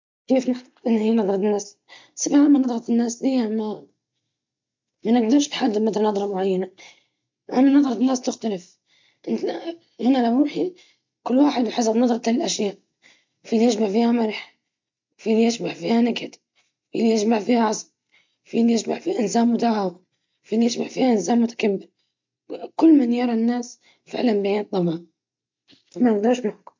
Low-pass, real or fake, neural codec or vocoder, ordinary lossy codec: 7.2 kHz; real; none; MP3, 48 kbps